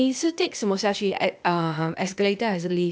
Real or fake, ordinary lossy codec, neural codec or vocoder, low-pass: fake; none; codec, 16 kHz, 0.8 kbps, ZipCodec; none